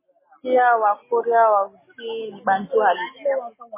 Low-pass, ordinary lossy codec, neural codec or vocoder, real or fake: 3.6 kHz; MP3, 16 kbps; none; real